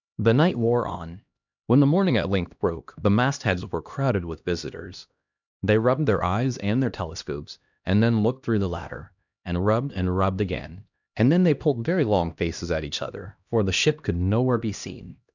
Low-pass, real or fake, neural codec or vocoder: 7.2 kHz; fake; codec, 16 kHz, 1 kbps, X-Codec, HuBERT features, trained on LibriSpeech